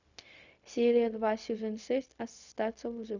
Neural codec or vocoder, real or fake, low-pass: codec, 16 kHz, 0.4 kbps, LongCat-Audio-Codec; fake; 7.2 kHz